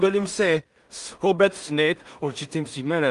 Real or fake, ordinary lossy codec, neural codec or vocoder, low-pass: fake; Opus, 32 kbps; codec, 16 kHz in and 24 kHz out, 0.4 kbps, LongCat-Audio-Codec, two codebook decoder; 10.8 kHz